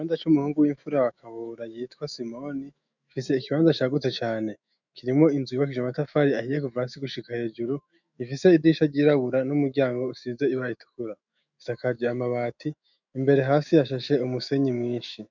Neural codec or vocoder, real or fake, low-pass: autoencoder, 48 kHz, 128 numbers a frame, DAC-VAE, trained on Japanese speech; fake; 7.2 kHz